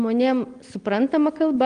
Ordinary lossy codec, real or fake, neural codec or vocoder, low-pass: Opus, 24 kbps; real; none; 9.9 kHz